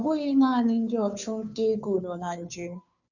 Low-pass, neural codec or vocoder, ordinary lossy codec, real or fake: 7.2 kHz; codec, 16 kHz, 2 kbps, FunCodec, trained on Chinese and English, 25 frames a second; none; fake